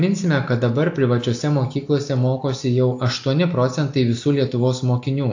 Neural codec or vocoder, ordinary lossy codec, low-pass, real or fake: none; AAC, 48 kbps; 7.2 kHz; real